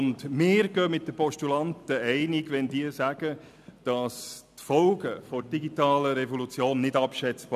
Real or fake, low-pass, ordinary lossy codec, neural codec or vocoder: real; 14.4 kHz; none; none